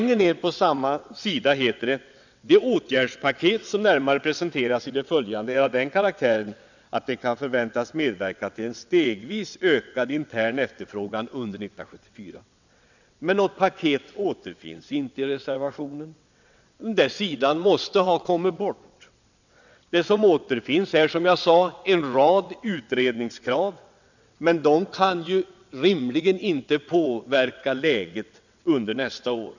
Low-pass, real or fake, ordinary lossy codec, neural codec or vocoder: 7.2 kHz; fake; none; vocoder, 22.05 kHz, 80 mel bands, WaveNeXt